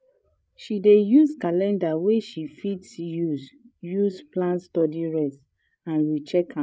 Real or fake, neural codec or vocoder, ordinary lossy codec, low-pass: fake; codec, 16 kHz, 8 kbps, FreqCodec, larger model; none; none